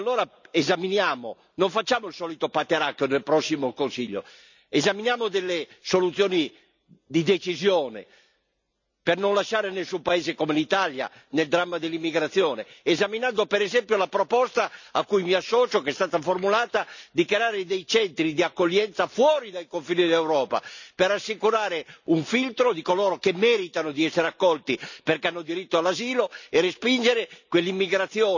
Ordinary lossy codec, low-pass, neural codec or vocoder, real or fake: none; 7.2 kHz; none; real